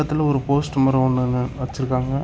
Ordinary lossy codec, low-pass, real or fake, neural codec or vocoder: none; none; real; none